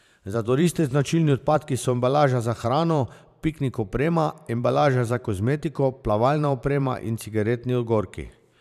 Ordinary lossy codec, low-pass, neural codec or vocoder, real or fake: none; 14.4 kHz; vocoder, 44.1 kHz, 128 mel bands every 512 samples, BigVGAN v2; fake